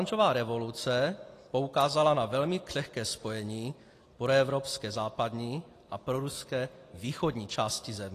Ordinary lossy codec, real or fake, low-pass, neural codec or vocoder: AAC, 48 kbps; real; 14.4 kHz; none